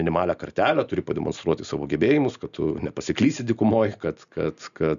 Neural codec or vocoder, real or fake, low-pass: none; real; 7.2 kHz